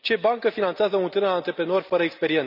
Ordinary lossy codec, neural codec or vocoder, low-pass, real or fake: none; none; 5.4 kHz; real